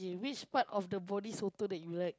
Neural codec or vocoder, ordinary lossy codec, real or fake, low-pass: none; none; real; none